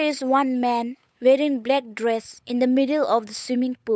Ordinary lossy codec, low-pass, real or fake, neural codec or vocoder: none; none; fake; codec, 16 kHz, 8 kbps, FunCodec, trained on Chinese and English, 25 frames a second